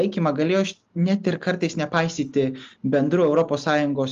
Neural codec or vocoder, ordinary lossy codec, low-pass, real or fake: none; Opus, 32 kbps; 7.2 kHz; real